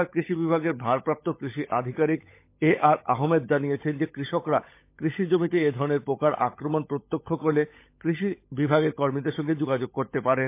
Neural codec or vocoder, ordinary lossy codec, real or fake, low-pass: codec, 16 kHz, 16 kbps, FunCodec, trained on LibriTTS, 50 frames a second; MP3, 24 kbps; fake; 3.6 kHz